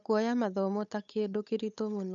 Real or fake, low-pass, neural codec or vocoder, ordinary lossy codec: fake; 7.2 kHz; codec, 16 kHz, 8 kbps, FunCodec, trained on Chinese and English, 25 frames a second; none